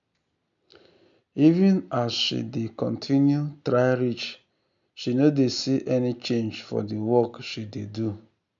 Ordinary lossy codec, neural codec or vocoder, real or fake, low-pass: none; none; real; 7.2 kHz